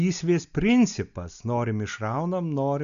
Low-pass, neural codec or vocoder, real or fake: 7.2 kHz; none; real